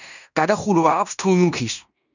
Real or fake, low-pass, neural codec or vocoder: fake; 7.2 kHz; codec, 16 kHz in and 24 kHz out, 0.9 kbps, LongCat-Audio-Codec, fine tuned four codebook decoder